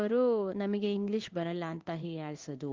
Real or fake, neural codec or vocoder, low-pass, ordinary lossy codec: fake; codec, 16 kHz in and 24 kHz out, 1 kbps, XY-Tokenizer; 7.2 kHz; Opus, 24 kbps